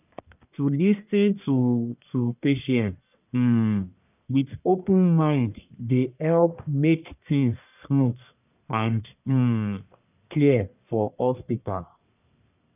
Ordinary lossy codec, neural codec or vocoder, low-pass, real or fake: none; codec, 44.1 kHz, 1.7 kbps, Pupu-Codec; 3.6 kHz; fake